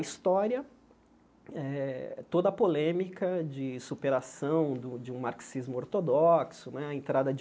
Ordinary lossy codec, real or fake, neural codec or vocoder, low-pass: none; real; none; none